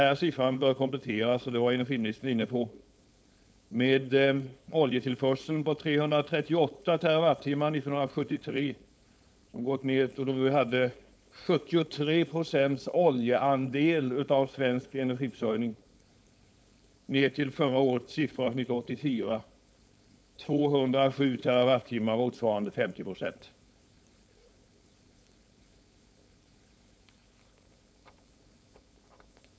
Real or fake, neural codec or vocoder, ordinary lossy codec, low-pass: fake; codec, 16 kHz, 4.8 kbps, FACodec; none; none